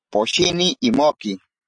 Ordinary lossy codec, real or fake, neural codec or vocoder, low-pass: AAC, 64 kbps; real; none; 9.9 kHz